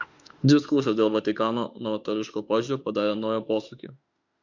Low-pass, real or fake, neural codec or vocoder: 7.2 kHz; fake; autoencoder, 48 kHz, 32 numbers a frame, DAC-VAE, trained on Japanese speech